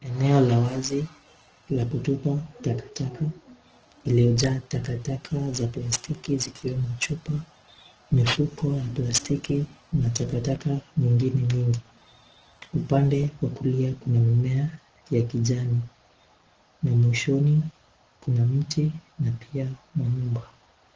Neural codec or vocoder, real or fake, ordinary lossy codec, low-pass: none; real; Opus, 16 kbps; 7.2 kHz